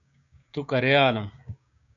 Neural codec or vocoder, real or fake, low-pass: codec, 16 kHz, 6 kbps, DAC; fake; 7.2 kHz